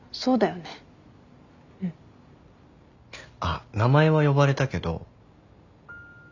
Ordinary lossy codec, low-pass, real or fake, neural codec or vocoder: none; 7.2 kHz; real; none